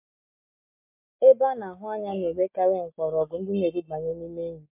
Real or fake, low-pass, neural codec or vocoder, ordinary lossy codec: real; 3.6 kHz; none; MP3, 32 kbps